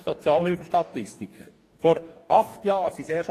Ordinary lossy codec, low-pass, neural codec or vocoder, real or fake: AAC, 48 kbps; 14.4 kHz; codec, 44.1 kHz, 2.6 kbps, DAC; fake